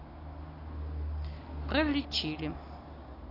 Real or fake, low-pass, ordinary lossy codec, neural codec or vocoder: real; 5.4 kHz; AAC, 24 kbps; none